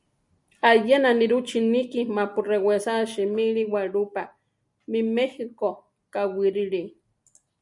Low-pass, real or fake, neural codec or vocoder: 10.8 kHz; real; none